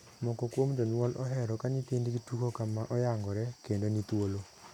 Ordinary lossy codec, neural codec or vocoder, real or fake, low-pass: none; none; real; 19.8 kHz